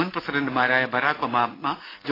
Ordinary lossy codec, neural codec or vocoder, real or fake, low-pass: AAC, 24 kbps; none; real; 5.4 kHz